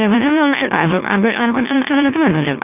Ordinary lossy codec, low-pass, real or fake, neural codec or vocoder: none; 3.6 kHz; fake; autoencoder, 44.1 kHz, a latent of 192 numbers a frame, MeloTTS